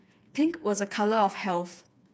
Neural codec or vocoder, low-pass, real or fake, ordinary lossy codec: codec, 16 kHz, 4 kbps, FreqCodec, smaller model; none; fake; none